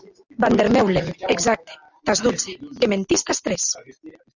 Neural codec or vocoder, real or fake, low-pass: none; real; 7.2 kHz